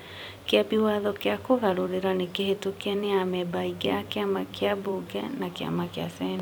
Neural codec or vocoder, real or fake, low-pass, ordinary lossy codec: vocoder, 44.1 kHz, 128 mel bands every 256 samples, BigVGAN v2; fake; none; none